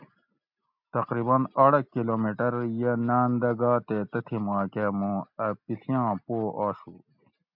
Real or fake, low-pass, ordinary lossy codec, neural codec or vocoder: real; 5.4 kHz; MP3, 48 kbps; none